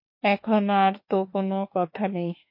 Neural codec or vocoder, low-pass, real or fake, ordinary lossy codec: autoencoder, 48 kHz, 32 numbers a frame, DAC-VAE, trained on Japanese speech; 5.4 kHz; fake; MP3, 32 kbps